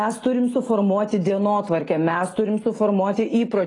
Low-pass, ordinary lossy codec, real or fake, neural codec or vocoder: 10.8 kHz; AAC, 32 kbps; real; none